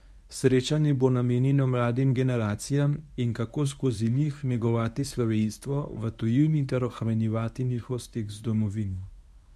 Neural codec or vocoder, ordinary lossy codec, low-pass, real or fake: codec, 24 kHz, 0.9 kbps, WavTokenizer, medium speech release version 1; none; none; fake